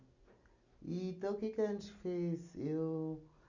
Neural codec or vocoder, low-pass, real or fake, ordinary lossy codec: none; 7.2 kHz; real; none